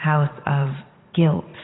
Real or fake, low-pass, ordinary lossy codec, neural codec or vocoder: fake; 7.2 kHz; AAC, 16 kbps; vocoder, 44.1 kHz, 80 mel bands, Vocos